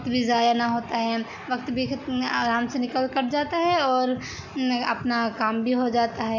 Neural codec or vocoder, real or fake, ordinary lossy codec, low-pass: none; real; none; 7.2 kHz